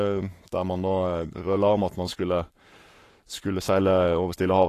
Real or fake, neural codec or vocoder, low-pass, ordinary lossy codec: fake; codec, 44.1 kHz, 7.8 kbps, Pupu-Codec; 14.4 kHz; AAC, 48 kbps